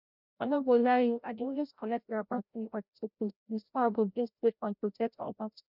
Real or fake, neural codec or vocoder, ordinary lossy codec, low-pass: fake; codec, 16 kHz, 0.5 kbps, FreqCodec, larger model; none; 5.4 kHz